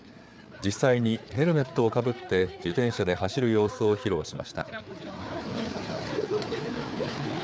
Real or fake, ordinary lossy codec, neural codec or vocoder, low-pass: fake; none; codec, 16 kHz, 4 kbps, FreqCodec, larger model; none